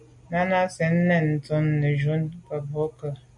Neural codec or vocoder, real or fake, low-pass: none; real; 10.8 kHz